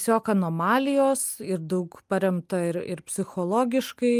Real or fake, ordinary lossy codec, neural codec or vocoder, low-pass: real; Opus, 32 kbps; none; 14.4 kHz